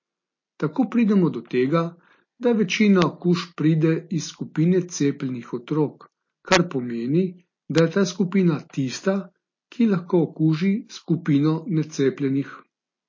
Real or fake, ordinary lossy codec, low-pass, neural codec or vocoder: real; MP3, 32 kbps; 7.2 kHz; none